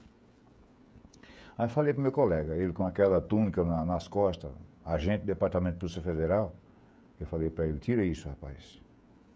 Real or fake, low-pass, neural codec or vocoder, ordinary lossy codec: fake; none; codec, 16 kHz, 16 kbps, FreqCodec, smaller model; none